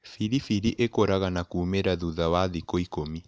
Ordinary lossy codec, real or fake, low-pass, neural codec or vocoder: none; real; none; none